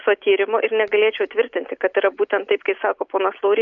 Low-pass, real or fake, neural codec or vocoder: 7.2 kHz; real; none